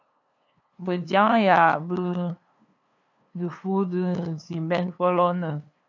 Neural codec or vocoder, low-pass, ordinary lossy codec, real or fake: codec, 16 kHz, 2 kbps, FunCodec, trained on LibriTTS, 25 frames a second; 7.2 kHz; MP3, 64 kbps; fake